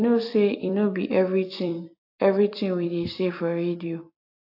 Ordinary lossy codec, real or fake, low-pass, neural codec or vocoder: AAC, 32 kbps; fake; 5.4 kHz; vocoder, 44.1 kHz, 128 mel bands every 256 samples, BigVGAN v2